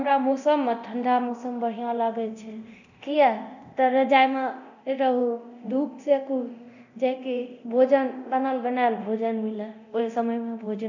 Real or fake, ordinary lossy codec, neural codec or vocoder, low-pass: fake; none; codec, 24 kHz, 0.9 kbps, DualCodec; 7.2 kHz